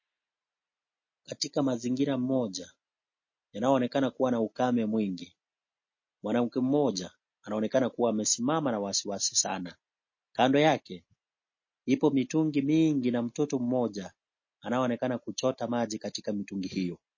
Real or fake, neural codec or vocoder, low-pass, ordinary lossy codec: real; none; 7.2 kHz; MP3, 32 kbps